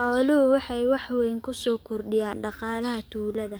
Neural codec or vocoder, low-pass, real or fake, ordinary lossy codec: codec, 44.1 kHz, 7.8 kbps, DAC; none; fake; none